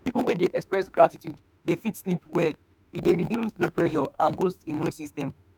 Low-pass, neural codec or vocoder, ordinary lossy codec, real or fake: none; autoencoder, 48 kHz, 32 numbers a frame, DAC-VAE, trained on Japanese speech; none; fake